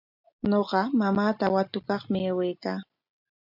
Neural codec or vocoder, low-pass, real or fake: none; 5.4 kHz; real